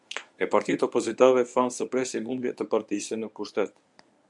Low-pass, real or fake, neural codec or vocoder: 10.8 kHz; fake; codec, 24 kHz, 0.9 kbps, WavTokenizer, medium speech release version 1